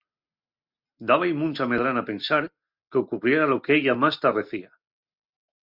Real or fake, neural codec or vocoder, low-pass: real; none; 5.4 kHz